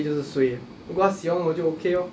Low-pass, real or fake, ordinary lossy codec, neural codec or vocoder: none; real; none; none